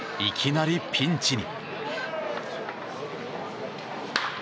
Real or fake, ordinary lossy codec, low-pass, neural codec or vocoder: real; none; none; none